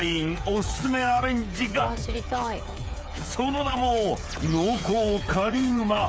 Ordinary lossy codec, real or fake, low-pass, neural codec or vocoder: none; fake; none; codec, 16 kHz, 8 kbps, FreqCodec, smaller model